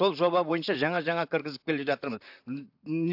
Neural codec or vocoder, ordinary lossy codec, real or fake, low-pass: vocoder, 44.1 kHz, 128 mel bands, Pupu-Vocoder; AAC, 48 kbps; fake; 5.4 kHz